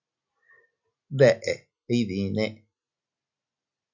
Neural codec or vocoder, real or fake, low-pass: none; real; 7.2 kHz